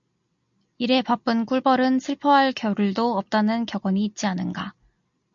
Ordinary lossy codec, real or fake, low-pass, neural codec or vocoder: MP3, 48 kbps; real; 7.2 kHz; none